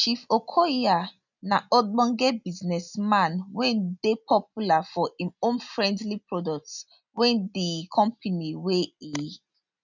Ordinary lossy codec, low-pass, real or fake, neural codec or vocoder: none; 7.2 kHz; real; none